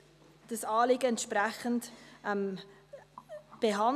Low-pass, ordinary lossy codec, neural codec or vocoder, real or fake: 14.4 kHz; none; none; real